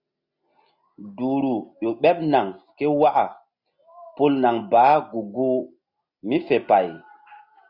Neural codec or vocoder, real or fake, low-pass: none; real; 5.4 kHz